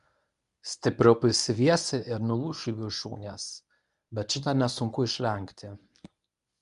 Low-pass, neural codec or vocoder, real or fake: 10.8 kHz; codec, 24 kHz, 0.9 kbps, WavTokenizer, medium speech release version 1; fake